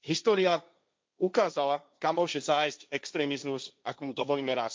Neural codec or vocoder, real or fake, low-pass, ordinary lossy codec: codec, 16 kHz, 1.1 kbps, Voila-Tokenizer; fake; none; none